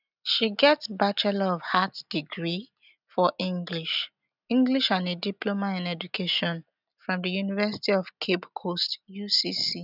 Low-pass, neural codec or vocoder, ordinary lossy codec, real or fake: 5.4 kHz; none; none; real